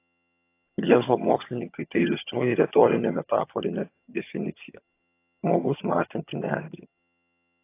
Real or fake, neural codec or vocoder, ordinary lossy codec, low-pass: fake; vocoder, 22.05 kHz, 80 mel bands, HiFi-GAN; AAC, 24 kbps; 3.6 kHz